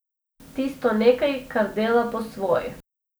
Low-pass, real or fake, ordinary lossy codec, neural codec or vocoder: none; real; none; none